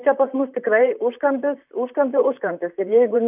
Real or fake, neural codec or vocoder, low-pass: fake; vocoder, 44.1 kHz, 128 mel bands, Pupu-Vocoder; 3.6 kHz